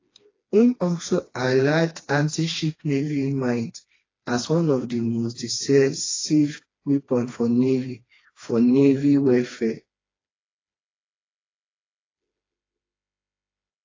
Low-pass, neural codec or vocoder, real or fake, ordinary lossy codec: 7.2 kHz; codec, 16 kHz, 2 kbps, FreqCodec, smaller model; fake; AAC, 32 kbps